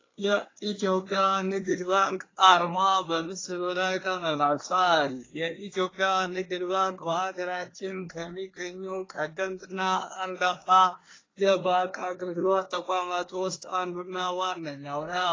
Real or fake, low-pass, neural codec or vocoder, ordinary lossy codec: fake; 7.2 kHz; codec, 24 kHz, 1 kbps, SNAC; AAC, 32 kbps